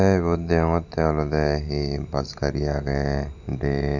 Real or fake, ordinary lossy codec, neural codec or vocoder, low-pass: fake; none; vocoder, 44.1 kHz, 128 mel bands every 512 samples, BigVGAN v2; 7.2 kHz